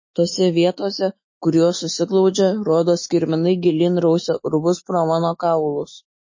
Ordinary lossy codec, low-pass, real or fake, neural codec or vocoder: MP3, 32 kbps; 7.2 kHz; fake; autoencoder, 48 kHz, 128 numbers a frame, DAC-VAE, trained on Japanese speech